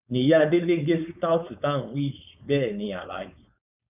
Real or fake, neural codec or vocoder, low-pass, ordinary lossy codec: fake; codec, 16 kHz, 4.8 kbps, FACodec; 3.6 kHz; none